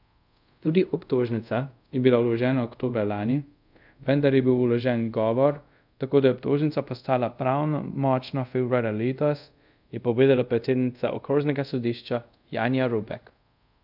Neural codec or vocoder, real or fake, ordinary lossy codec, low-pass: codec, 24 kHz, 0.5 kbps, DualCodec; fake; none; 5.4 kHz